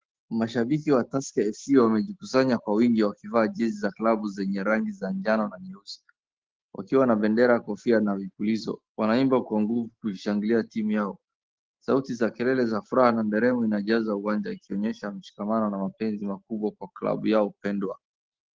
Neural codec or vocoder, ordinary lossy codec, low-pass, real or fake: none; Opus, 16 kbps; 7.2 kHz; real